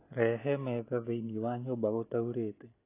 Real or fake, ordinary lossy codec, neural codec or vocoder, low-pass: real; AAC, 24 kbps; none; 3.6 kHz